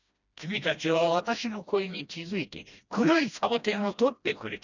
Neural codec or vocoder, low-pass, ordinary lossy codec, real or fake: codec, 16 kHz, 1 kbps, FreqCodec, smaller model; 7.2 kHz; none; fake